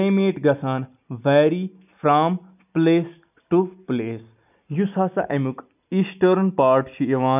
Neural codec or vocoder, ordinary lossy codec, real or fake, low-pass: none; none; real; 3.6 kHz